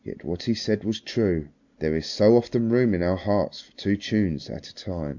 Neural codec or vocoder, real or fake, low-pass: none; real; 7.2 kHz